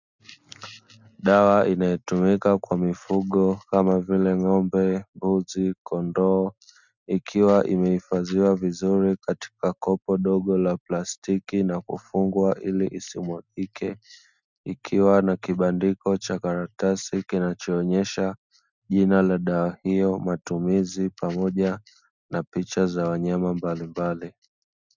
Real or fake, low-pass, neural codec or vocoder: real; 7.2 kHz; none